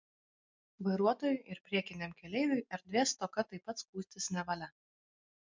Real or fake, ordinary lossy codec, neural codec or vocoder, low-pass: real; MP3, 64 kbps; none; 7.2 kHz